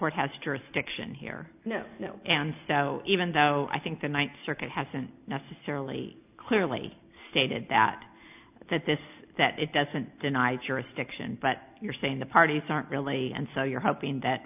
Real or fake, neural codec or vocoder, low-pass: real; none; 3.6 kHz